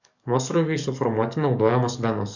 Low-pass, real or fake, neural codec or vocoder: 7.2 kHz; fake; codec, 44.1 kHz, 7.8 kbps, DAC